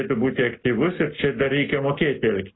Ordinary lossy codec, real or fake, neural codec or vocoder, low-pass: AAC, 16 kbps; real; none; 7.2 kHz